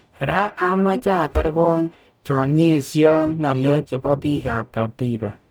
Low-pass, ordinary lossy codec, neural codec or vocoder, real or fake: none; none; codec, 44.1 kHz, 0.9 kbps, DAC; fake